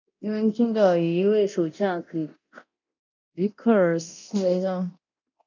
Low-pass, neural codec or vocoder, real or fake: 7.2 kHz; codec, 24 kHz, 0.9 kbps, DualCodec; fake